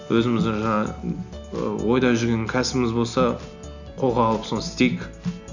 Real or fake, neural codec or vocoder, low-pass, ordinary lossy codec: real; none; 7.2 kHz; none